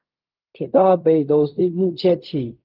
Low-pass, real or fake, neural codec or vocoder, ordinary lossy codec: 5.4 kHz; fake; codec, 16 kHz in and 24 kHz out, 0.4 kbps, LongCat-Audio-Codec, fine tuned four codebook decoder; Opus, 32 kbps